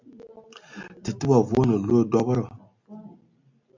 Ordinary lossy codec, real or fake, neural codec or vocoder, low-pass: MP3, 64 kbps; real; none; 7.2 kHz